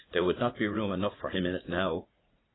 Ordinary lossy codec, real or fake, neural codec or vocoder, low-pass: AAC, 16 kbps; fake; vocoder, 44.1 kHz, 80 mel bands, Vocos; 7.2 kHz